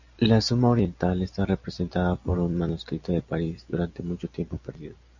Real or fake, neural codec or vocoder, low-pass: real; none; 7.2 kHz